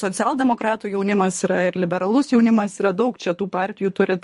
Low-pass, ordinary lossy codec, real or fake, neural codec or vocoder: 10.8 kHz; MP3, 48 kbps; fake; codec, 24 kHz, 3 kbps, HILCodec